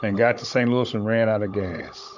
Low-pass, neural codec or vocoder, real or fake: 7.2 kHz; codec, 16 kHz, 16 kbps, FunCodec, trained on Chinese and English, 50 frames a second; fake